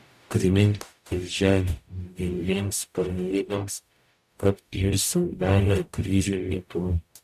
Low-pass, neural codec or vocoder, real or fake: 14.4 kHz; codec, 44.1 kHz, 0.9 kbps, DAC; fake